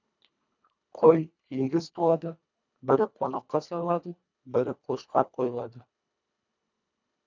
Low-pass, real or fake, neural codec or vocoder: 7.2 kHz; fake; codec, 24 kHz, 1.5 kbps, HILCodec